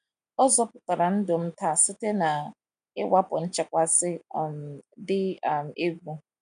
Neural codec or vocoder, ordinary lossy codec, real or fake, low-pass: none; none; real; 9.9 kHz